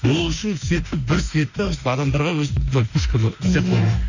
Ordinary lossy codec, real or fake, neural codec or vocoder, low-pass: none; fake; autoencoder, 48 kHz, 32 numbers a frame, DAC-VAE, trained on Japanese speech; 7.2 kHz